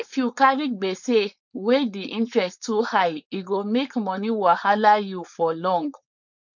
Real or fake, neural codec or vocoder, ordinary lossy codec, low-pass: fake; codec, 16 kHz, 4.8 kbps, FACodec; none; 7.2 kHz